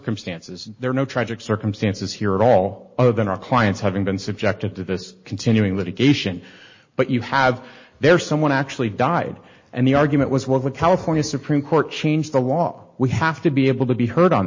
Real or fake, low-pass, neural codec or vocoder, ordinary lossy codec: real; 7.2 kHz; none; MP3, 32 kbps